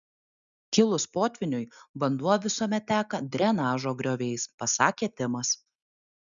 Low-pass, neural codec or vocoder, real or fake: 7.2 kHz; none; real